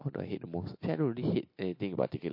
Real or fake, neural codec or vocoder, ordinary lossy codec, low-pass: real; none; MP3, 32 kbps; 5.4 kHz